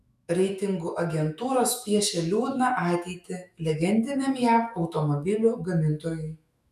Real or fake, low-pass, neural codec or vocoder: fake; 14.4 kHz; autoencoder, 48 kHz, 128 numbers a frame, DAC-VAE, trained on Japanese speech